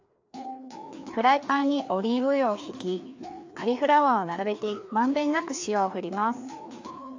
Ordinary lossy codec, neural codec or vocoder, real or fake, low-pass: AAC, 48 kbps; codec, 16 kHz, 2 kbps, FreqCodec, larger model; fake; 7.2 kHz